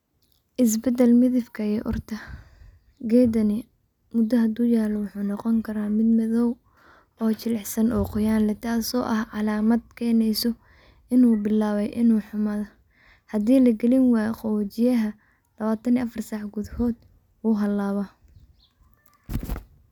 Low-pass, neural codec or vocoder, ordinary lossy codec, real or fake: 19.8 kHz; none; none; real